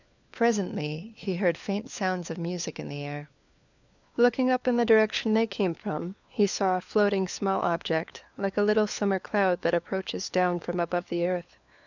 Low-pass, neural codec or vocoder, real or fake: 7.2 kHz; codec, 16 kHz, 4 kbps, FunCodec, trained on LibriTTS, 50 frames a second; fake